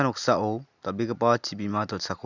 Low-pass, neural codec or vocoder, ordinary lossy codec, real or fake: 7.2 kHz; none; none; real